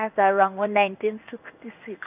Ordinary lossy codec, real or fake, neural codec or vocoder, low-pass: none; fake; codec, 16 kHz in and 24 kHz out, 1 kbps, XY-Tokenizer; 3.6 kHz